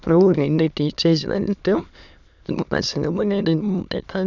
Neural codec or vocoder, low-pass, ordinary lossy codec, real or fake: autoencoder, 22.05 kHz, a latent of 192 numbers a frame, VITS, trained on many speakers; 7.2 kHz; none; fake